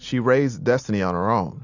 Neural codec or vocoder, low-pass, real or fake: none; 7.2 kHz; real